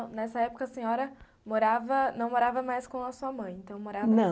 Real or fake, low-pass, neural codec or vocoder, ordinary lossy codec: real; none; none; none